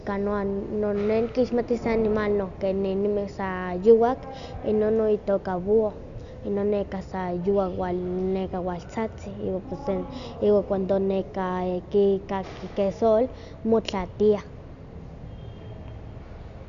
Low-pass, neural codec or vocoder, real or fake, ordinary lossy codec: 7.2 kHz; none; real; none